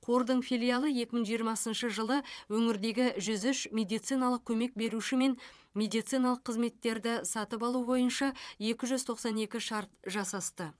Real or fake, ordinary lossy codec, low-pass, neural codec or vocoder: fake; none; none; vocoder, 22.05 kHz, 80 mel bands, Vocos